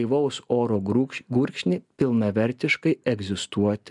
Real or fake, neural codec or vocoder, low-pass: real; none; 10.8 kHz